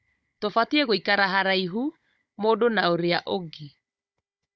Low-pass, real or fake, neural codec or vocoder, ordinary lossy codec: none; fake; codec, 16 kHz, 16 kbps, FunCodec, trained on Chinese and English, 50 frames a second; none